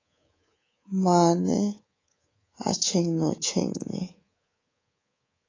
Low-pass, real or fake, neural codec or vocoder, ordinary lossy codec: 7.2 kHz; fake; codec, 24 kHz, 3.1 kbps, DualCodec; AAC, 32 kbps